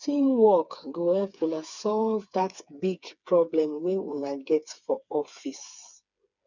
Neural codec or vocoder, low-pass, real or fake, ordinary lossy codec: codec, 16 kHz, 4 kbps, FreqCodec, smaller model; 7.2 kHz; fake; none